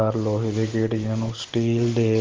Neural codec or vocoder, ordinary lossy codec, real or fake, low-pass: none; Opus, 16 kbps; real; 7.2 kHz